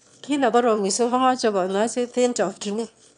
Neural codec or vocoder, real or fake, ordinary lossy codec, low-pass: autoencoder, 22.05 kHz, a latent of 192 numbers a frame, VITS, trained on one speaker; fake; none; 9.9 kHz